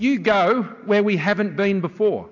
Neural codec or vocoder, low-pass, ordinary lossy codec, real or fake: none; 7.2 kHz; AAC, 48 kbps; real